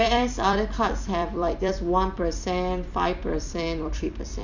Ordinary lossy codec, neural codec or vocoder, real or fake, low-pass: none; vocoder, 44.1 kHz, 128 mel bands every 256 samples, BigVGAN v2; fake; 7.2 kHz